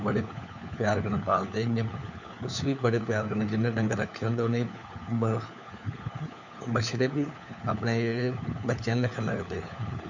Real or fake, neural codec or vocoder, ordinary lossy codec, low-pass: fake; codec, 16 kHz, 4 kbps, FunCodec, trained on LibriTTS, 50 frames a second; none; 7.2 kHz